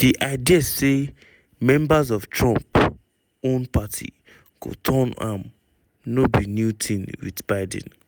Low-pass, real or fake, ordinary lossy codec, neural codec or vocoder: none; real; none; none